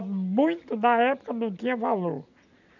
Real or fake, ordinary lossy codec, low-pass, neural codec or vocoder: real; none; 7.2 kHz; none